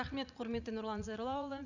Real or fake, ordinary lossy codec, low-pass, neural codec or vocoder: fake; none; 7.2 kHz; vocoder, 44.1 kHz, 80 mel bands, Vocos